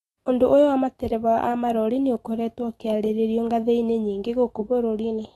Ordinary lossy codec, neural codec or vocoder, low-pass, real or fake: AAC, 32 kbps; autoencoder, 48 kHz, 128 numbers a frame, DAC-VAE, trained on Japanese speech; 19.8 kHz; fake